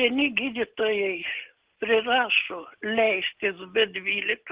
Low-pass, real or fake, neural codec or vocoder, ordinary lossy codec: 3.6 kHz; real; none; Opus, 16 kbps